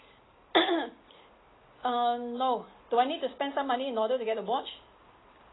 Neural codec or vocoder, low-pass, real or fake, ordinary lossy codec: none; 7.2 kHz; real; AAC, 16 kbps